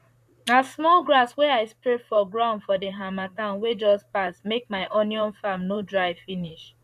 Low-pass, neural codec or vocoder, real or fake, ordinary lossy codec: 14.4 kHz; vocoder, 44.1 kHz, 128 mel bands, Pupu-Vocoder; fake; none